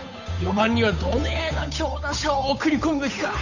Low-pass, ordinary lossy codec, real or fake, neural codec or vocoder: 7.2 kHz; none; fake; codec, 16 kHz, 8 kbps, FunCodec, trained on Chinese and English, 25 frames a second